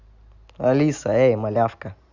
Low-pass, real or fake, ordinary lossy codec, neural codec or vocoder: 7.2 kHz; real; Opus, 64 kbps; none